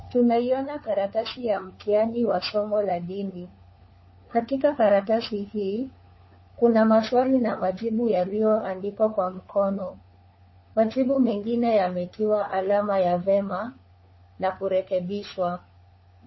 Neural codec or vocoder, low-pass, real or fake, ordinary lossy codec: codec, 16 kHz, 4 kbps, FunCodec, trained on LibriTTS, 50 frames a second; 7.2 kHz; fake; MP3, 24 kbps